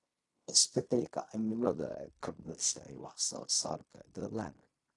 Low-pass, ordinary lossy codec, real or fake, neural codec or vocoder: 10.8 kHz; AAC, 48 kbps; fake; codec, 16 kHz in and 24 kHz out, 0.4 kbps, LongCat-Audio-Codec, fine tuned four codebook decoder